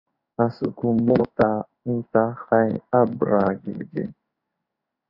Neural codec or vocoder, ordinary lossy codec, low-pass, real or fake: codec, 16 kHz in and 24 kHz out, 1 kbps, XY-Tokenizer; AAC, 48 kbps; 5.4 kHz; fake